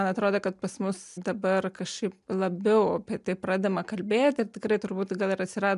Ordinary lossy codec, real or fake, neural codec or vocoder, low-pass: MP3, 96 kbps; real; none; 10.8 kHz